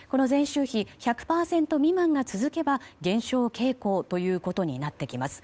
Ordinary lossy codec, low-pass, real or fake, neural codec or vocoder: none; none; fake; codec, 16 kHz, 8 kbps, FunCodec, trained on Chinese and English, 25 frames a second